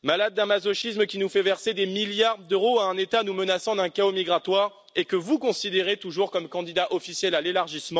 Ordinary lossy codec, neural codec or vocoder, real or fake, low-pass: none; none; real; none